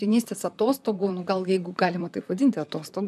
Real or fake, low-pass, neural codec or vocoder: fake; 14.4 kHz; vocoder, 44.1 kHz, 128 mel bands, Pupu-Vocoder